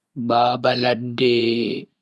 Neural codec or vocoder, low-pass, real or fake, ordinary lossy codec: vocoder, 24 kHz, 100 mel bands, Vocos; none; fake; none